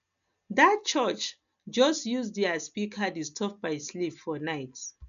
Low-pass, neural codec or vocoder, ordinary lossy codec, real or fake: 7.2 kHz; none; none; real